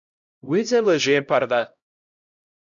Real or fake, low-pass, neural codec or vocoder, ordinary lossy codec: fake; 7.2 kHz; codec, 16 kHz, 0.5 kbps, X-Codec, HuBERT features, trained on LibriSpeech; MP3, 96 kbps